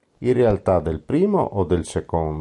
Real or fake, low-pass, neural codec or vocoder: real; 10.8 kHz; none